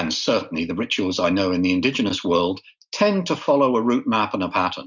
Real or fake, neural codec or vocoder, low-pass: real; none; 7.2 kHz